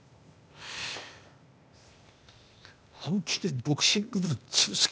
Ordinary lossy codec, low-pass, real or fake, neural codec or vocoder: none; none; fake; codec, 16 kHz, 0.8 kbps, ZipCodec